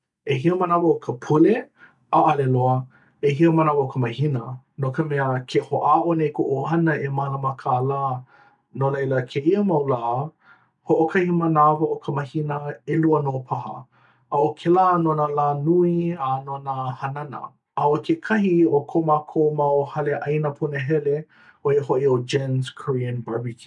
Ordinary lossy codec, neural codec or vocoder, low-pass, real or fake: none; none; 10.8 kHz; real